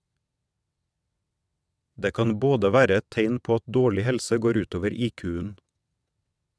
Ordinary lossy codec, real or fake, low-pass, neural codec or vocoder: none; fake; none; vocoder, 22.05 kHz, 80 mel bands, WaveNeXt